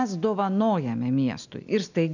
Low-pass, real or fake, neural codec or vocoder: 7.2 kHz; real; none